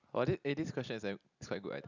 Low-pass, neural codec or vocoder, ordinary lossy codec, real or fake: 7.2 kHz; none; none; real